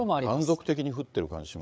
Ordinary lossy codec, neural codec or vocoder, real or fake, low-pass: none; codec, 16 kHz, 8 kbps, FreqCodec, larger model; fake; none